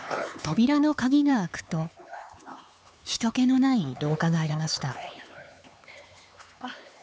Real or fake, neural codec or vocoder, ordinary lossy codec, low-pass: fake; codec, 16 kHz, 4 kbps, X-Codec, HuBERT features, trained on LibriSpeech; none; none